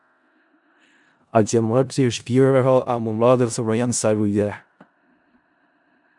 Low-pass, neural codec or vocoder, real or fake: 10.8 kHz; codec, 16 kHz in and 24 kHz out, 0.4 kbps, LongCat-Audio-Codec, four codebook decoder; fake